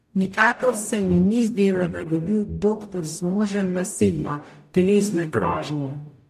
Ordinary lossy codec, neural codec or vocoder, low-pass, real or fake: MP3, 64 kbps; codec, 44.1 kHz, 0.9 kbps, DAC; 14.4 kHz; fake